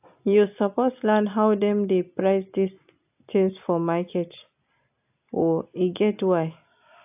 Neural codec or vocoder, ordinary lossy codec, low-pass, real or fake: none; AAC, 32 kbps; 3.6 kHz; real